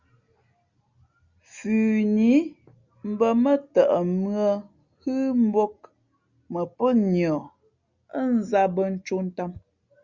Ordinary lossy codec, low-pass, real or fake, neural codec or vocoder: Opus, 64 kbps; 7.2 kHz; real; none